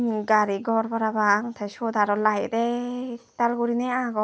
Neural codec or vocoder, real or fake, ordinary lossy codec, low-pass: none; real; none; none